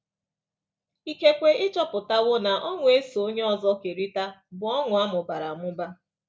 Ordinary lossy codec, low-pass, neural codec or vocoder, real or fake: none; none; none; real